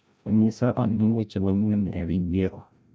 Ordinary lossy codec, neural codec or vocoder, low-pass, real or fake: none; codec, 16 kHz, 0.5 kbps, FreqCodec, larger model; none; fake